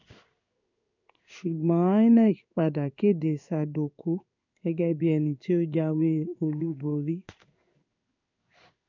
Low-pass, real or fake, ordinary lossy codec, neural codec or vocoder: 7.2 kHz; fake; none; codec, 16 kHz in and 24 kHz out, 1 kbps, XY-Tokenizer